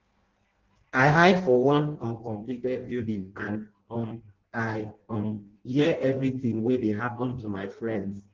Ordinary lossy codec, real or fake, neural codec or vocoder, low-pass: Opus, 16 kbps; fake; codec, 16 kHz in and 24 kHz out, 0.6 kbps, FireRedTTS-2 codec; 7.2 kHz